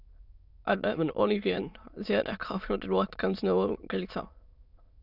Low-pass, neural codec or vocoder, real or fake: 5.4 kHz; autoencoder, 22.05 kHz, a latent of 192 numbers a frame, VITS, trained on many speakers; fake